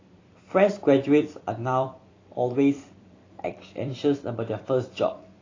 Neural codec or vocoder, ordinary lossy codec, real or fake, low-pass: vocoder, 44.1 kHz, 128 mel bands every 256 samples, BigVGAN v2; AAC, 32 kbps; fake; 7.2 kHz